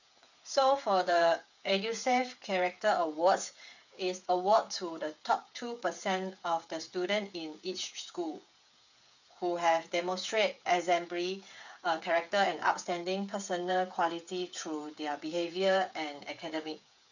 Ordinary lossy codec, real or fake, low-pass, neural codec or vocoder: none; fake; 7.2 kHz; codec, 16 kHz, 8 kbps, FreqCodec, smaller model